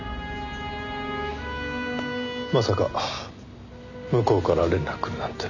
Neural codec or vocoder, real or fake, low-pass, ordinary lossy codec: none; real; 7.2 kHz; none